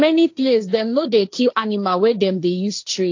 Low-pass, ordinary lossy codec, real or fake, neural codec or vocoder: 7.2 kHz; AAC, 48 kbps; fake; codec, 16 kHz, 1.1 kbps, Voila-Tokenizer